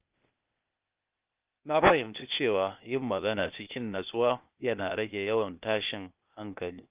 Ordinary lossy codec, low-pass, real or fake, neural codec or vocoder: Opus, 24 kbps; 3.6 kHz; fake; codec, 16 kHz, 0.8 kbps, ZipCodec